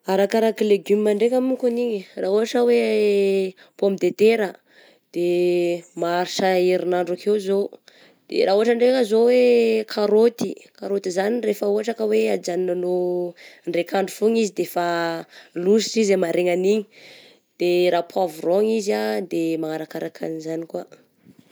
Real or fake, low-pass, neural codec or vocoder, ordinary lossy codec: real; none; none; none